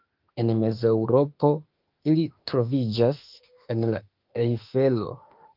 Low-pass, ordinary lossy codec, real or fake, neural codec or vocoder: 5.4 kHz; Opus, 32 kbps; fake; autoencoder, 48 kHz, 32 numbers a frame, DAC-VAE, trained on Japanese speech